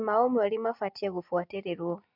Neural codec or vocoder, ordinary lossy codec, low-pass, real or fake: none; MP3, 48 kbps; 5.4 kHz; real